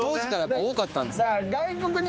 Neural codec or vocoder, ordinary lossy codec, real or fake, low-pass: codec, 16 kHz, 4 kbps, X-Codec, HuBERT features, trained on general audio; none; fake; none